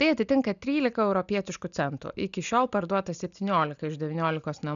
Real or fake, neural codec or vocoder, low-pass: real; none; 7.2 kHz